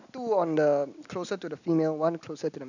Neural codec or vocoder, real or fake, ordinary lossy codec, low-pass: none; real; none; 7.2 kHz